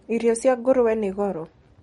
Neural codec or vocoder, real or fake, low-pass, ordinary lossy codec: none; real; 10.8 kHz; MP3, 48 kbps